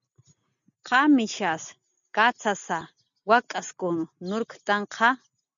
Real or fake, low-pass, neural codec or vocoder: real; 7.2 kHz; none